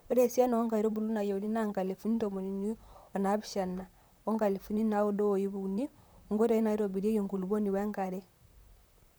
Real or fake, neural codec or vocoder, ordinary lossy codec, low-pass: fake; vocoder, 44.1 kHz, 128 mel bands, Pupu-Vocoder; none; none